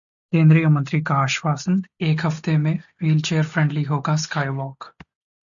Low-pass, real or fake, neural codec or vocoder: 7.2 kHz; real; none